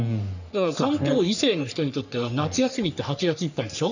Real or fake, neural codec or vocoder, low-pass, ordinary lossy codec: fake; codec, 44.1 kHz, 3.4 kbps, Pupu-Codec; 7.2 kHz; none